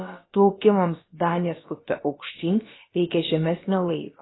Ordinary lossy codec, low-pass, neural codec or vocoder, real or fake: AAC, 16 kbps; 7.2 kHz; codec, 16 kHz, about 1 kbps, DyCAST, with the encoder's durations; fake